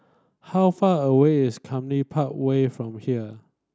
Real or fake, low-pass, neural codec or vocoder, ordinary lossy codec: real; none; none; none